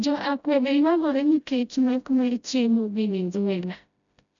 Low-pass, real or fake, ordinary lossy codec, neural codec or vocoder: 7.2 kHz; fake; none; codec, 16 kHz, 0.5 kbps, FreqCodec, smaller model